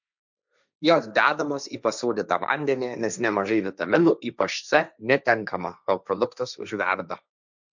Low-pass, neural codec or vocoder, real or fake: 7.2 kHz; codec, 16 kHz, 1.1 kbps, Voila-Tokenizer; fake